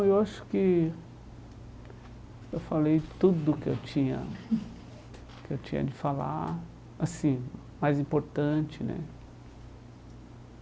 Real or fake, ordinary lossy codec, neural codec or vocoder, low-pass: real; none; none; none